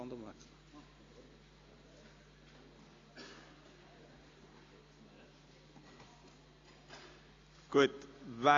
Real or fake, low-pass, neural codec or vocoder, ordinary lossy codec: real; 7.2 kHz; none; AAC, 32 kbps